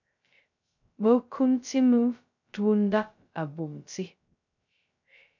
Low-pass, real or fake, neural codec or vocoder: 7.2 kHz; fake; codec, 16 kHz, 0.2 kbps, FocalCodec